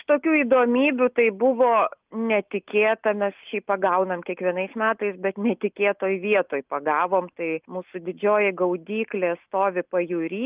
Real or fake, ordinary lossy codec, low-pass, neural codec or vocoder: real; Opus, 24 kbps; 3.6 kHz; none